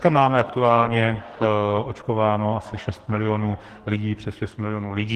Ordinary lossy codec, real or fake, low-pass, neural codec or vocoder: Opus, 16 kbps; fake; 14.4 kHz; codec, 44.1 kHz, 2.6 kbps, SNAC